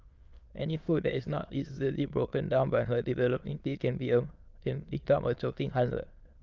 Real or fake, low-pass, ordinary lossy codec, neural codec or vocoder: fake; 7.2 kHz; Opus, 24 kbps; autoencoder, 22.05 kHz, a latent of 192 numbers a frame, VITS, trained on many speakers